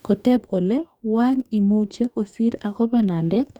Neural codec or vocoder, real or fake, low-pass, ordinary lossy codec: codec, 44.1 kHz, 2.6 kbps, DAC; fake; 19.8 kHz; none